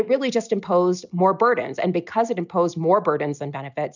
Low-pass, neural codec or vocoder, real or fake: 7.2 kHz; none; real